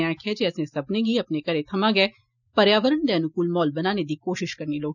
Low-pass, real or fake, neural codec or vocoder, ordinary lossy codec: 7.2 kHz; real; none; none